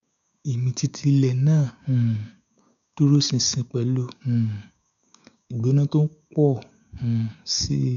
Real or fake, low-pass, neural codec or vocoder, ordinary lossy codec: fake; 7.2 kHz; codec, 16 kHz, 6 kbps, DAC; none